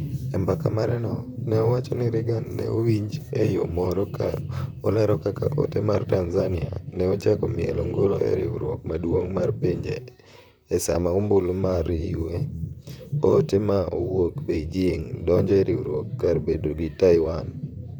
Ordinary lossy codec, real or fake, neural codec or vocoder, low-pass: none; fake; vocoder, 44.1 kHz, 128 mel bands, Pupu-Vocoder; none